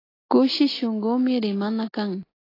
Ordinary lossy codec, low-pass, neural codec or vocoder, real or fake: AAC, 24 kbps; 5.4 kHz; none; real